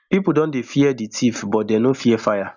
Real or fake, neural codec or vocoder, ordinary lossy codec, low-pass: real; none; none; 7.2 kHz